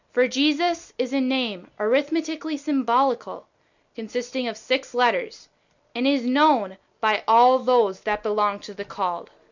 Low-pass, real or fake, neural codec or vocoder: 7.2 kHz; real; none